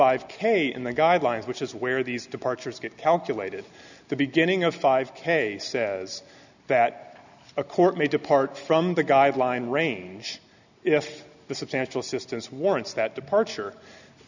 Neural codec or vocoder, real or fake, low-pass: none; real; 7.2 kHz